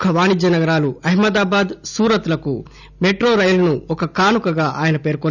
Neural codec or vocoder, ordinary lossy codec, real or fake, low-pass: none; none; real; 7.2 kHz